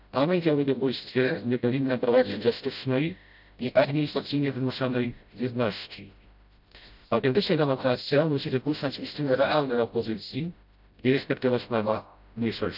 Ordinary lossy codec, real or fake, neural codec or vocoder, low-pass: none; fake; codec, 16 kHz, 0.5 kbps, FreqCodec, smaller model; 5.4 kHz